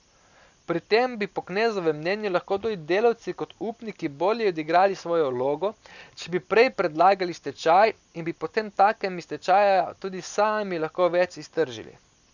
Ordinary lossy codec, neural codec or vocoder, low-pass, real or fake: none; none; 7.2 kHz; real